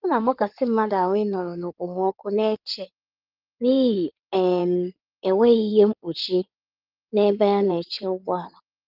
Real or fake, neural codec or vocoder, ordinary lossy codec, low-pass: fake; codec, 16 kHz in and 24 kHz out, 2.2 kbps, FireRedTTS-2 codec; Opus, 32 kbps; 5.4 kHz